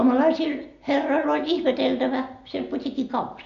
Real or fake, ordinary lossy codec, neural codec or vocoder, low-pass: real; MP3, 48 kbps; none; 7.2 kHz